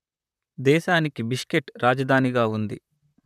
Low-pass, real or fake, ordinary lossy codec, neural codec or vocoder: 14.4 kHz; fake; none; vocoder, 44.1 kHz, 128 mel bands, Pupu-Vocoder